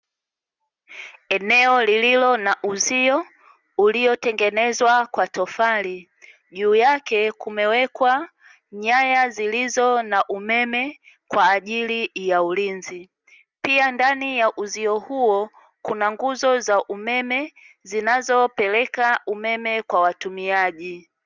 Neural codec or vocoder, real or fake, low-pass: none; real; 7.2 kHz